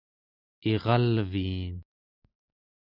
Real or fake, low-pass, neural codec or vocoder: real; 5.4 kHz; none